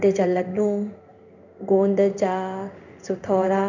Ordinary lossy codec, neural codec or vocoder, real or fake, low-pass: none; codec, 16 kHz in and 24 kHz out, 1 kbps, XY-Tokenizer; fake; 7.2 kHz